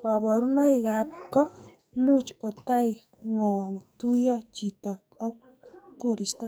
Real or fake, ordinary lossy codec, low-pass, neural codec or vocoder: fake; none; none; codec, 44.1 kHz, 2.6 kbps, SNAC